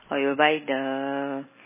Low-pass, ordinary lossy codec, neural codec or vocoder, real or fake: 3.6 kHz; MP3, 16 kbps; none; real